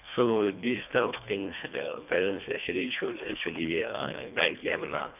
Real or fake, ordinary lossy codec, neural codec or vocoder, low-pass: fake; none; codec, 24 kHz, 1.5 kbps, HILCodec; 3.6 kHz